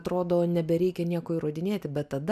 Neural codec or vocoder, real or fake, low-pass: none; real; 14.4 kHz